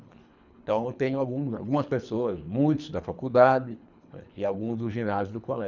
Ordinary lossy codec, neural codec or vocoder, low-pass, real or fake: none; codec, 24 kHz, 3 kbps, HILCodec; 7.2 kHz; fake